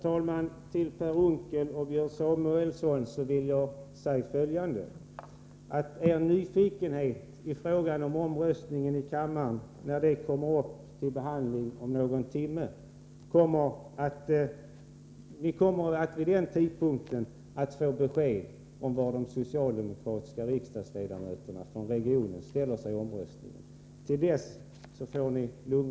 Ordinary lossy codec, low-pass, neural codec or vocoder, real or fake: none; none; none; real